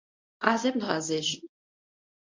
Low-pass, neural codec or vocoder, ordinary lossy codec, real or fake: 7.2 kHz; codec, 24 kHz, 0.9 kbps, WavTokenizer, medium speech release version 1; MP3, 48 kbps; fake